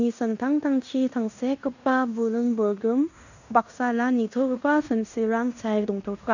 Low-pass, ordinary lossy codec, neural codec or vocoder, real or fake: 7.2 kHz; none; codec, 16 kHz in and 24 kHz out, 0.9 kbps, LongCat-Audio-Codec, fine tuned four codebook decoder; fake